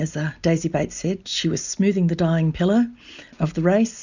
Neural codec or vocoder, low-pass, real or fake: none; 7.2 kHz; real